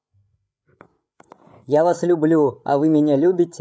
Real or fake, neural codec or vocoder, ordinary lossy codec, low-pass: fake; codec, 16 kHz, 8 kbps, FreqCodec, larger model; none; none